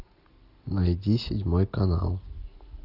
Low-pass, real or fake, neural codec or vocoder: 5.4 kHz; real; none